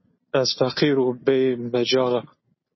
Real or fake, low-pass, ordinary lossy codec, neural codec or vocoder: real; 7.2 kHz; MP3, 24 kbps; none